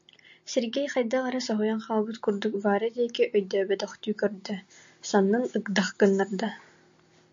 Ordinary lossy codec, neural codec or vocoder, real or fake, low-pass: AAC, 64 kbps; none; real; 7.2 kHz